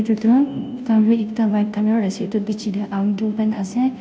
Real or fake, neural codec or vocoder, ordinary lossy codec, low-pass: fake; codec, 16 kHz, 0.5 kbps, FunCodec, trained on Chinese and English, 25 frames a second; none; none